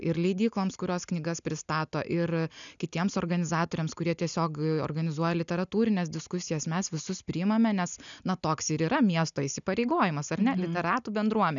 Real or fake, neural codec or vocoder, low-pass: real; none; 7.2 kHz